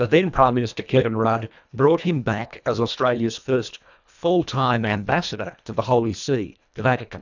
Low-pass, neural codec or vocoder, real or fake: 7.2 kHz; codec, 24 kHz, 1.5 kbps, HILCodec; fake